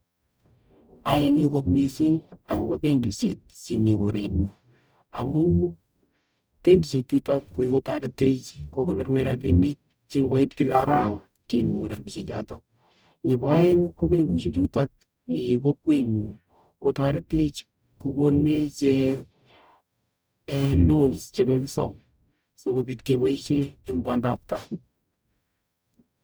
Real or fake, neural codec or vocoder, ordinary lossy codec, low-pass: fake; codec, 44.1 kHz, 0.9 kbps, DAC; none; none